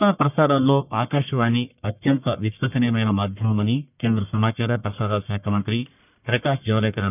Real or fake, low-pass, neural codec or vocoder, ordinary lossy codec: fake; 3.6 kHz; codec, 44.1 kHz, 1.7 kbps, Pupu-Codec; none